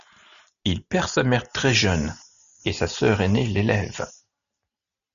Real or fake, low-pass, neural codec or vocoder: real; 7.2 kHz; none